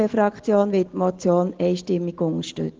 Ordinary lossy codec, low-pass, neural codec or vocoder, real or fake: Opus, 16 kbps; 7.2 kHz; none; real